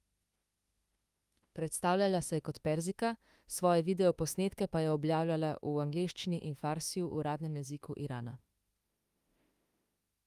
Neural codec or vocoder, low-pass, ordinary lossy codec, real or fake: autoencoder, 48 kHz, 32 numbers a frame, DAC-VAE, trained on Japanese speech; 14.4 kHz; Opus, 32 kbps; fake